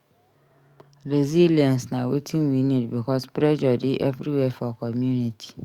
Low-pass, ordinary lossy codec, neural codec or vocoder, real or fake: 19.8 kHz; Opus, 64 kbps; codec, 44.1 kHz, 7.8 kbps, DAC; fake